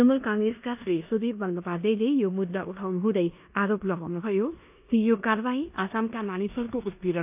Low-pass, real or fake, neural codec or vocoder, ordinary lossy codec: 3.6 kHz; fake; codec, 16 kHz in and 24 kHz out, 0.9 kbps, LongCat-Audio-Codec, four codebook decoder; AAC, 32 kbps